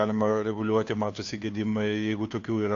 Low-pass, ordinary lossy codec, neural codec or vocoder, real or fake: 7.2 kHz; AAC, 48 kbps; codec, 16 kHz, 2 kbps, FunCodec, trained on Chinese and English, 25 frames a second; fake